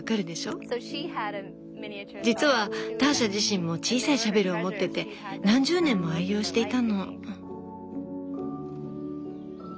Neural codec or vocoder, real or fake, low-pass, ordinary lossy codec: none; real; none; none